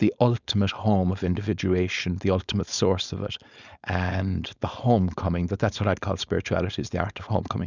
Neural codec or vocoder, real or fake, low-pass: codec, 16 kHz, 4.8 kbps, FACodec; fake; 7.2 kHz